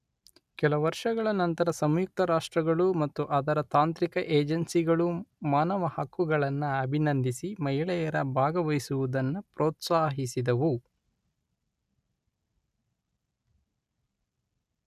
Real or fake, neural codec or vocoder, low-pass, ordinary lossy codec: real; none; 14.4 kHz; none